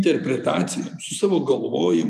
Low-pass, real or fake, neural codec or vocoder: 14.4 kHz; real; none